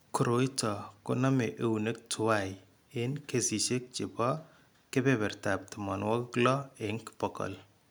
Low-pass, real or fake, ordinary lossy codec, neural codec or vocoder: none; real; none; none